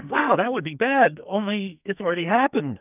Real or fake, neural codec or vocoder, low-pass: fake; codec, 44.1 kHz, 2.6 kbps, SNAC; 3.6 kHz